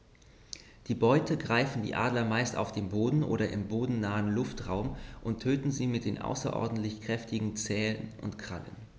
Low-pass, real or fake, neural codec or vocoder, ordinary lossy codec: none; real; none; none